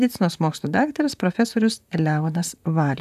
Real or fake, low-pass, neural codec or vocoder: fake; 14.4 kHz; vocoder, 44.1 kHz, 128 mel bands, Pupu-Vocoder